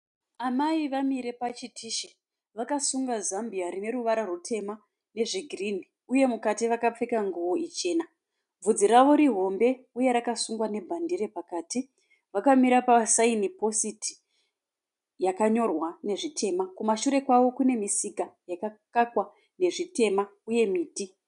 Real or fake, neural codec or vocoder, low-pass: real; none; 10.8 kHz